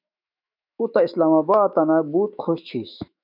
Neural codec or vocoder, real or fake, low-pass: autoencoder, 48 kHz, 128 numbers a frame, DAC-VAE, trained on Japanese speech; fake; 5.4 kHz